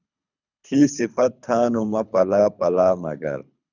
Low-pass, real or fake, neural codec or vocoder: 7.2 kHz; fake; codec, 24 kHz, 3 kbps, HILCodec